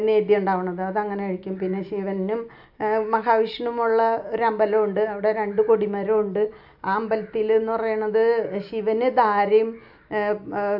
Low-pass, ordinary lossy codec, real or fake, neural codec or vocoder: 5.4 kHz; AAC, 48 kbps; real; none